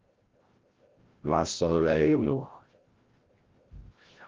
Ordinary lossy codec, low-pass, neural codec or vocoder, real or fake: Opus, 16 kbps; 7.2 kHz; codec, 16 kHz, 0.5 kbps, FreqCodec, larger model; fake